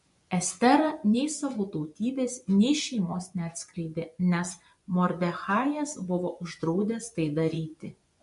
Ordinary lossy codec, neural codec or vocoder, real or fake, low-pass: MP3, 64 kbps; vocoder, 24 kHz, 100 mel bands, Vocos; fake; 10.8 kHz